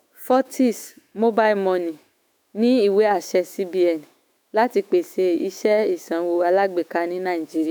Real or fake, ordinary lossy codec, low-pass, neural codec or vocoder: fake; none; none; autoencoder, 48 kHz, 128 numbers a frame, DAC-VAE, trained on Japanese speech